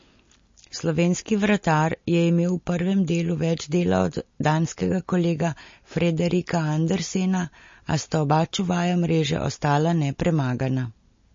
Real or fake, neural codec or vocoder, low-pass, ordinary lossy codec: real; none; 7.2 kHz; MP3, 32 kbps